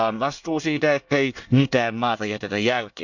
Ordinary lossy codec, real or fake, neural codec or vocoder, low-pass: none; fake; codec, 24 kHz, 1 kbps, SNAC; 7.2 kHz